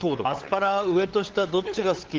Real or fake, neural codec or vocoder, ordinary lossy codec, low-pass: fake; codec, 16 kHz, 8 kbps, FunCodec, trained on LibriTTS, 25 frames a second; Opus, 16 kbps; 7.2 kHz